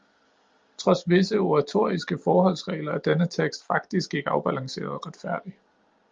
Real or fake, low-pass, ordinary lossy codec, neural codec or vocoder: real; 7.2 kHz; Opus, 32 kbps; none